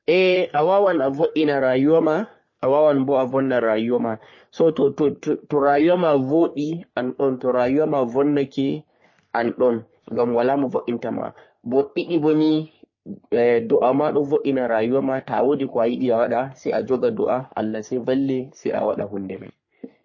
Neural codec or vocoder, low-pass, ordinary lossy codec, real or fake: codec, 44.1 kHz, 3.4 kbps, Pupu-Codec; 7.2 kHz; MP3, 32 kbps; fake